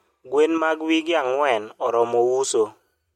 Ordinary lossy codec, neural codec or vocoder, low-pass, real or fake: MP3, 64 kbps; none; 19.8 kHz; real